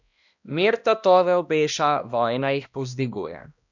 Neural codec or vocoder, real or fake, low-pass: codec, 16 kHz, 1 kbps, X-Codec, HuBERT features, trained on balanced general audio; fake; 7.2 kHz